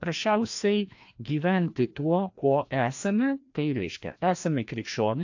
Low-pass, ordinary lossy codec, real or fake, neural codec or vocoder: 7.2 kHz; AAC, 48 kbps; fake; codec, 16 kHz, 1 kbps, FreqCodec, larger model